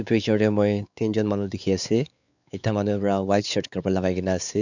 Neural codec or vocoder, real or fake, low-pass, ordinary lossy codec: codec, 16 kHz, 4 kbps, X-Codec, WavLM features, trained on Multilingual LibriSpeech; fake; 7.2 kHz; none